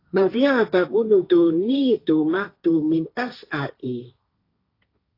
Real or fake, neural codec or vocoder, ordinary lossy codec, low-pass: fake; codec, 16 kHz, 1.1 kbps, Voila-Tokenizer; AAC, 32 kbps; 5.4 kHz